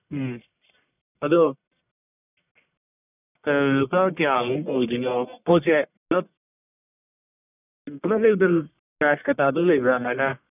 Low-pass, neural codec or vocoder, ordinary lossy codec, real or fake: 3.6 kHz; codec, 44.1 kHz, 1.7 kbps, Pupu-Codec; none; fake